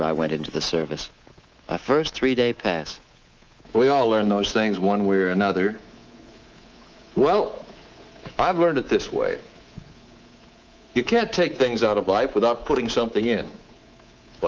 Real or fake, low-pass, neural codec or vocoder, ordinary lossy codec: fake; 7.2 kHz; codec, 24 kHz, 3.1 kbps, DualCodec; Opus, 24 kbps